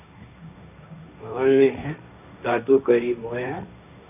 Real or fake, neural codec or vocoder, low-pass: fake; codec, 16 kHz, 1.1 kbps, Voila-Tokenizer; 3.6 kHz